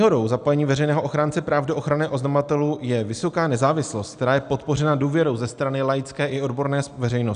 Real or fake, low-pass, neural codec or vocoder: real; 9.9 kHz; none